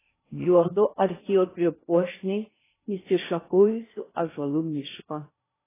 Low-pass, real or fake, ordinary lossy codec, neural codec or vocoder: 3.6 kHz; fake; AAC, 16 kbps; codec, 16 kHz in and 24 kHz out, 0.6 kbps, FocalCodec, streaming, 2048 codes